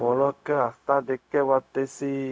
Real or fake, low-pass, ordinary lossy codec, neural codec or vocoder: fake; none; none; codec, 16 kHz, 0.4 kbps, LongCat-Audio-Codec